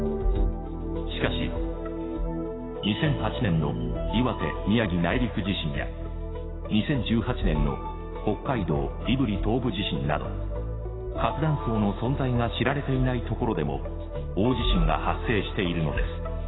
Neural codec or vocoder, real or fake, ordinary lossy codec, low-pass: codec, 16 kHz, 6 kbps, DAC; fake; AAC, 16 kbps; 7.2 kHz